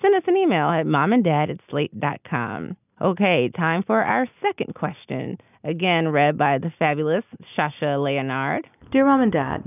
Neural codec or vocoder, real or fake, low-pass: none; real; 3.6 kHz